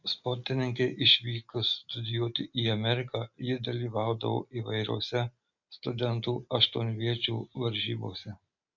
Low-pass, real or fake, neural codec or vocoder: 7.2 kHz; real; none